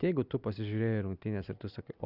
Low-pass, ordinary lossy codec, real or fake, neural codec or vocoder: 5.4 kHz; Opus, 64 kbps; real; none